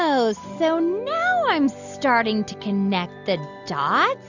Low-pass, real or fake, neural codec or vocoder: 7.2 kHz; real; none